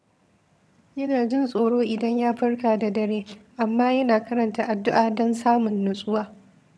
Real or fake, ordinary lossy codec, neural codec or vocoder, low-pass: fake; none; vocoder, 22.05 kHz, 80 mel bands, HiFi-GAN; none